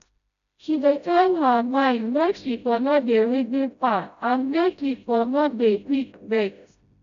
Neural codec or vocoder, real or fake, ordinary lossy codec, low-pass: codec, 16 kHz, 0.5 kbps, FreqCodec, smaller model; fake; MP3, 96 kbps; 7.2 kHz